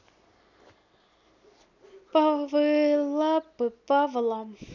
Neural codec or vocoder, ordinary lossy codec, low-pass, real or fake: none; none; 7.2 kHz; real